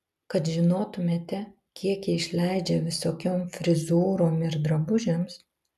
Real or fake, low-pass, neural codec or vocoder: real; 14.4 kHz; none